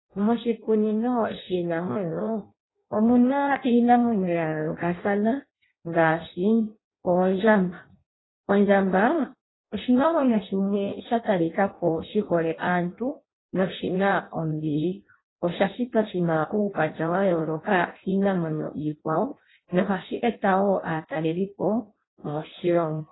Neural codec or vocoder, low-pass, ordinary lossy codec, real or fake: codec, 16 kHz in and 24 kHz out, 0.6 kbps, FireRedTTS-2 codec; 7.2 kHz; AAC, 16 kbps; fake